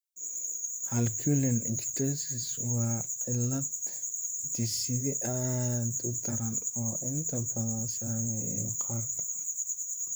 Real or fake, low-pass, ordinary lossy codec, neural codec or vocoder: fake; none; none; vocoder, 44.1 kHz, 128 mel bands, Pupu-Vocoder